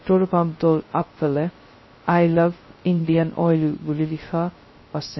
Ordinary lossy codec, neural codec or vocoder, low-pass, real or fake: MP3, 24 kbps; codec, 16 kHz, 0.2 kbps, FocalCodec; 7.2 kHz; fake